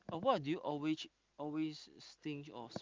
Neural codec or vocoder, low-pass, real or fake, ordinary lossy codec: none; 7.2 kHz; real; Opus, 24 kbps